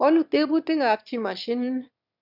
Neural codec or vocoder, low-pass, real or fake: autoencoder, 22.05 kHz, a latent of 192 numbers a frame, VITS, trained on one speaker; 5.4 kHz; fake